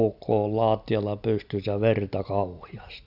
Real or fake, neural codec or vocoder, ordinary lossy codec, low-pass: real; none; none; 5.4 kHz